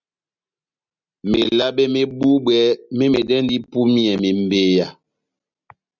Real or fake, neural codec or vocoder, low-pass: real; none; 7.2 kHz